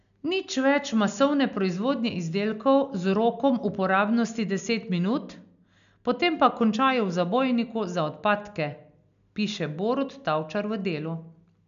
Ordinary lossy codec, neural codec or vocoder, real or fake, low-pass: none; none; real; 7.2 kHz